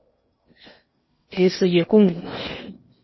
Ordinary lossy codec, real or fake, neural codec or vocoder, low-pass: MP3, 24 kbps; fake; codec, 16 kHz in and 24 kHz out, 0.8 kbps, FocalCodec, streaming, 65536 codes; 7.2 kHz